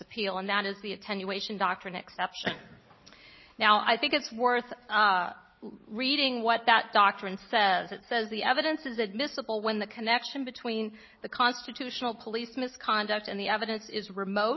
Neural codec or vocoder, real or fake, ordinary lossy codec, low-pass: none; real; MP3, 24 kbps; 7.2 kHz